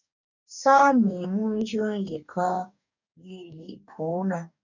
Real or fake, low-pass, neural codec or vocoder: fake; 7.2 kHz; codec, 44.1 kHz, 2.6 kbps, DAC